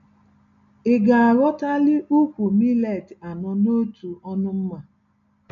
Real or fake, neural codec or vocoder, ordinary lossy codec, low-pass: real; none; none; 7.2 kHz